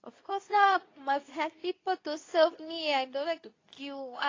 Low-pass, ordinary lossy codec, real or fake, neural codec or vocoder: 7.2 kHz; AAC, 32 kbps; fake; codec, 24 kHz, 0.9 kbps, WavTokenizer, medium speech release version 2